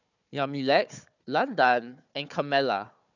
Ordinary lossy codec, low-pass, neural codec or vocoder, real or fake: none; 7.2 kHz; codec, 16 kHz, 4 kbps, FunCodec, trained on Chinese and English, 50 frames a second; fake